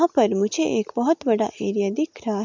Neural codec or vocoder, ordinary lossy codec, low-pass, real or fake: none; MP3, 64 kbps; 7.2 kHz; real